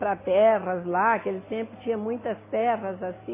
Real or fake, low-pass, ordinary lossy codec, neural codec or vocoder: real; 3.6 kHz; MP3, 16 kbps; none